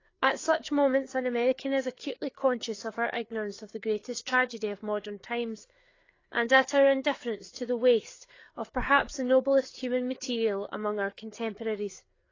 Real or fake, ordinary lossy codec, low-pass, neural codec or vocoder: fake; AAC, 32 kbps; 7.2 kHz; codec, 16 kHz, 4 kbps, FreqCodec, larger model